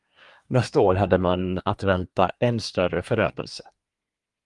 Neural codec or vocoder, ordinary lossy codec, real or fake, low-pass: codec, 24 kHz, 1 kbps, SNAC; Opus, 32 kbps; fake; 10.8 kHz